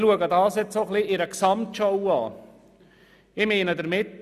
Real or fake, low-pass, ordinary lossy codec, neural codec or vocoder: real; 14.4 kHz; none; none